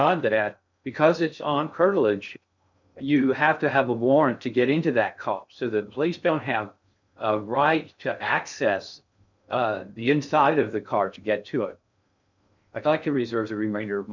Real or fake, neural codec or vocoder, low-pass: fake; codec, 16 kHz in and 24 kHz out, 0.6 kbps, FocalCodec, streaming, 2048 codes; 7.2 kHz